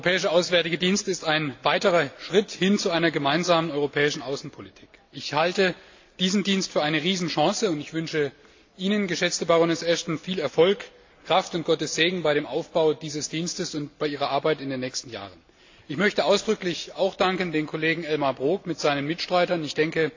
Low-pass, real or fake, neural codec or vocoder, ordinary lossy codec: 7.2 kHz; real; none; AAC, 48 kbps